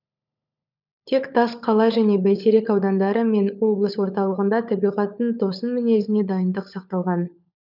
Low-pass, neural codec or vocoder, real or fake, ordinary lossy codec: 5.4 kHz; codec, 16 kHz, 16 kbps, FunCodec, trained on LibriTTS, 50 frames a second; fake; none